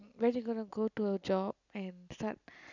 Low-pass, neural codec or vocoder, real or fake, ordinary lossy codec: 7.2 kHz; none; real; none